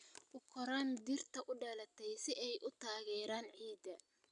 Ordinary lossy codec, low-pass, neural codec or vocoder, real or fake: none; 10.8 kHz; none; real